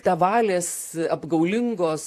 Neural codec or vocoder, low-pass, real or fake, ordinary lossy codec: vocoder, 44.1 kHz, 128 mel bands, Pupu-Vocoder; 14.4 kHz; fake; AAC, 64 kbps